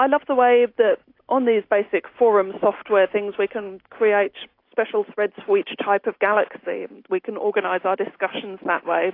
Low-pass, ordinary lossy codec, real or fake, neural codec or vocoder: 5.4 kHz; AAC, 32 kbps; real; none